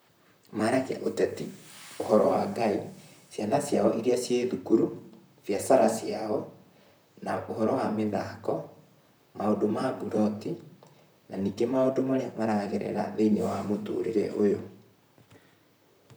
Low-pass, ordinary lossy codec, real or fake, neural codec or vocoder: none; none; fake; vocoder, 44.1 kHz, 128 mel bands, Pupu-Vocoder